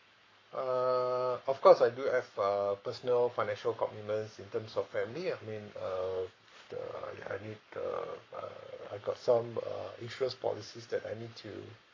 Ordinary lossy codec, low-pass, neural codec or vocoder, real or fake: AAC, 32 kbps; 7.2 kHz; codec, 44.1 kHz, 7.8 kbps, Pupu-Codec; fake